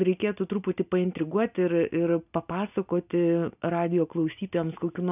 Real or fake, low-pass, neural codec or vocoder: real; 3.6 kHz; none